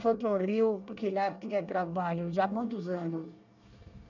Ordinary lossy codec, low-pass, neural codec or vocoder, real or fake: none; 7.2 kHz; codec, 24 kHz, 1 kbps, SNAC; fake